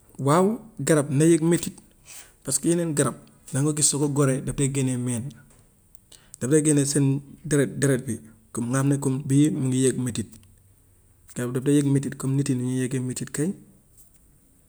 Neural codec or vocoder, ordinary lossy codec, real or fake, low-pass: none; none; real; none